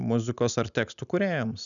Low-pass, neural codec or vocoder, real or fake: 7.2 kHz; none; real